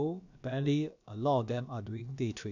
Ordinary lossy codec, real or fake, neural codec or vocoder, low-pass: none; fake; codec, 16 kHz, about 1 kbps, DyCAST, with the encoder's durations; 7.2 kHz